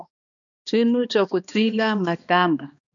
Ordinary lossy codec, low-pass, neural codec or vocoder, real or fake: MP3, 96 kbps; 7.2 kHz; codec, 16 kHz, 2 kbps, X-Codec, HuBERT features, trained on balanced general audio; fake